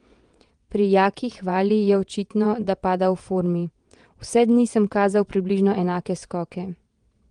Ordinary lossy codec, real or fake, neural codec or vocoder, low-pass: Opus, 24 kbps; fake; vocoder, 22.05 kHz, 80 mel bands, WaveNeXt; 9.9 kHz